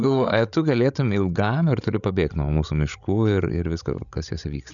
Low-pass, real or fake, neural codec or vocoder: 7.2 kHz; fake; codec, 16 kHz, 16 kbps, FreqCodec, larger model